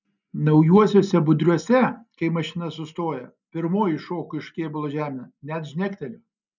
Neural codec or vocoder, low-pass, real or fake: none; 7.2 kHz; real